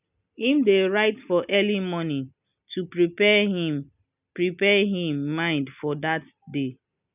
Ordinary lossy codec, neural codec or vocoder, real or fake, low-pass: none; none; real; 3.6 kHz